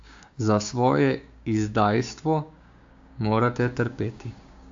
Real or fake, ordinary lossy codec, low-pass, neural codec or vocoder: fake; AAC, 64 kbps; 7.2 kHz; codec, 16 kHz, 6 kbps, DAC